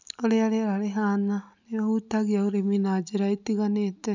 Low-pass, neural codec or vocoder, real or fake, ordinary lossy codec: 7.2 kHz; none; real; none